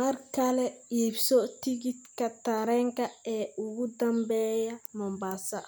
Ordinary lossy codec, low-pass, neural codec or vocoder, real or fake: none; none; none; real